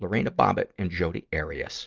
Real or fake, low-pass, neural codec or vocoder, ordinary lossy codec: real; 7.2 kHz; none; Opus, 24 kbps